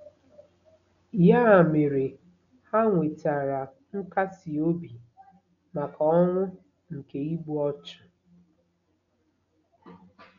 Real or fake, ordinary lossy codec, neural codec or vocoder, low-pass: real; MP3, 96 kbps; none; 7.2 kHz